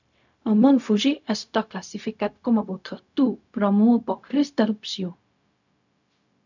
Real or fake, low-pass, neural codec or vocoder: fake; 7.2 kHz; codec, 16 kHz, 0.4 kbps, LongCat-Audio-Codec